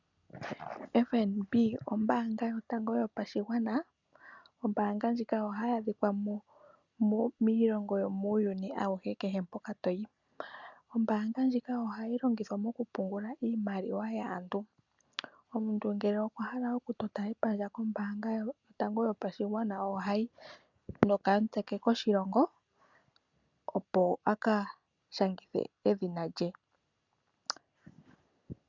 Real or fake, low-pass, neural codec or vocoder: real; 7.2 kHz; none